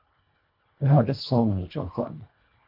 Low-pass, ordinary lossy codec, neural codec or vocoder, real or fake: 5.4 kHz; AAC, 32 kbps; codec, 24 kHz, 1.5 kbps, HILCodec; fake